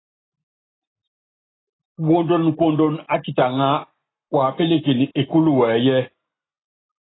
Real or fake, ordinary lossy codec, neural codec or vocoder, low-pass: real; AAC, 16 kbps; none; 7.2 kHz